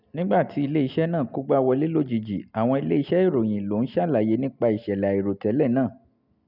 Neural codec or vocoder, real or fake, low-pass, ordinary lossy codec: none; real; 5.4 kHz; none